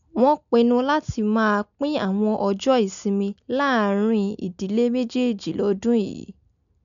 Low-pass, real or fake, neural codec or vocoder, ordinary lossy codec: 7.2 kHz; real; none; none